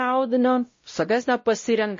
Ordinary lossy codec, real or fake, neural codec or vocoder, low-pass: MP3, 32 kbps; fake; codec, 16 kHz, 0.5 kbps, X-Codec, WavLM features, trained on Multilingual LibriSpeech; 7.2 kHz